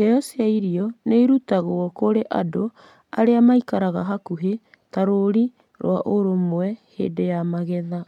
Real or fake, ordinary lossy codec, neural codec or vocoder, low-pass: real; MP3, 96 kbps; none; 19.8 kHz